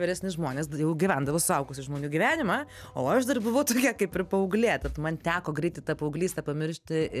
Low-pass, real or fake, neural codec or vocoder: 14.4 kHz; real; none